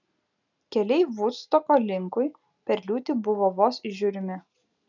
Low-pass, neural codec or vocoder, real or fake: 7.2 kHz; none; real